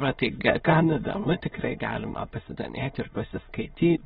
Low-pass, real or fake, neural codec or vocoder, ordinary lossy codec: 9.9 kHz; fake; autoencoder, 22.05 kHz, a latent of 192 numbers a frame, VITS, trained on many speakers; AAC, 16 kbps